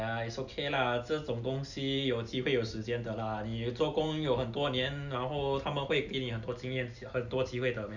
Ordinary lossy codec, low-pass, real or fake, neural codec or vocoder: none; 7.2 kHz; real; none